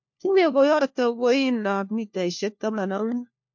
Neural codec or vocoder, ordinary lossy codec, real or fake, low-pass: codec, 16 kHz, 1 kbps, FunCodec, trained on LibriTTS, 50 frames a second; MP3, 48 kbps; fake; 7.2 kHz